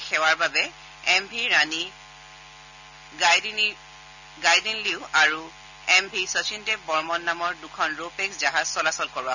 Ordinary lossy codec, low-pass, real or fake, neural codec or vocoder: none; 7.2 kHz; real; none